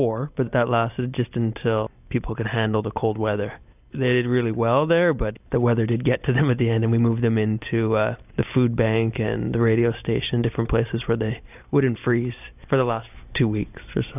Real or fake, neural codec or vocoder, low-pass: real; none; 3.6 kHz